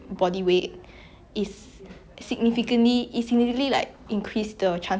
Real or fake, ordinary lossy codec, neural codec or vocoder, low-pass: real; none; none; none